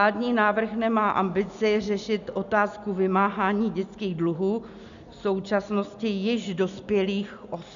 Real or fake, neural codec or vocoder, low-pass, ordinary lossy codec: real; none; 7.2 kHz; MP3, 96 kbps